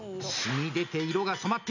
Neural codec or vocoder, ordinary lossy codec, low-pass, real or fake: none; AAC, 48 kbps; 7.2 kHz; real